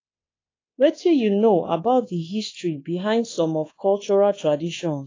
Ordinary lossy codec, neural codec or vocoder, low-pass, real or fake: AAC, 32 kbps; codec, 24 kHz, 1.2 kbps, DualCodec; 7.2 kHz; fake